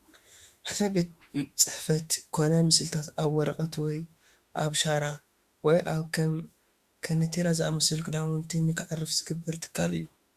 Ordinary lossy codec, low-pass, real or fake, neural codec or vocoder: Opus, 64 kbps; 14.4 kHz; fake; autoencoder, 48 kHz, 32 numbers a frame, DAC-VAE, trained on Japanese speech